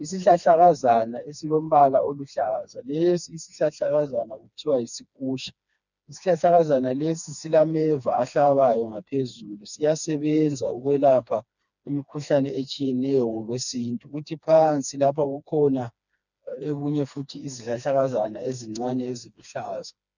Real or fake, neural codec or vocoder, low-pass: fake; codec, 16 kHz, 2 kbps, FreqCodec, smaller model; 7.2 kHz